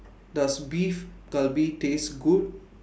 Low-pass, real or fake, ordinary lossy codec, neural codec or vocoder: none; real; none; none